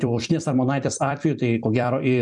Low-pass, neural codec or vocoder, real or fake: 10.8 kHz; vocoder, 44.1 kHz, 128 mel bands every 256 samples, BigVGAN v2; fake